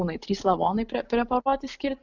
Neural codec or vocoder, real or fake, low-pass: none; real; 7.2 kHz